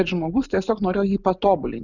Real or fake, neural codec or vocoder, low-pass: real; none; 7.2 kHz